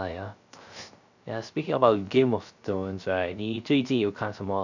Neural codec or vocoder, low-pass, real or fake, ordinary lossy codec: codec, 16 kHz, 0.3 kbps, FocalCodec; 7.2 kHz; fake; none